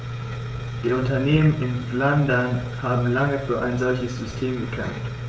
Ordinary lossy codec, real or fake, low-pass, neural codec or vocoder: none; fake; none; codec, 16 kHz, 16 kbps, FreqCodec, smaller model